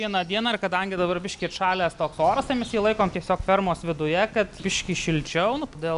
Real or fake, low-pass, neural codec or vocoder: real; 10.8 kHz; none